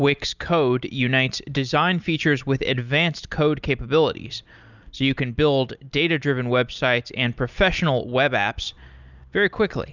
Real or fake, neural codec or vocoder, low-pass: real; none; 7.2 kHz